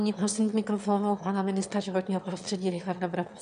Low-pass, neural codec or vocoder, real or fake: 9.9 kHz; autoencoder, 22.05 kHz, a latent of 192 numbers a frame, VITS, trained on one speaker; fake